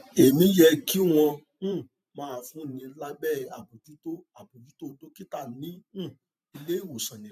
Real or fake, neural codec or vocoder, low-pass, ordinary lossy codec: fake; vocoder, 44.1 kHz, 128 mel bands every 512 samples, BigVGAN v2; 14.4 kHz; none